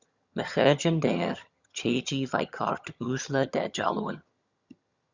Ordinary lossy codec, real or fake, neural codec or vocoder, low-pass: Opus, 64 kbps; fake; vocoder, 22.05 kHz, 80 mel bands, HiFi-GAN; 7.2 kHz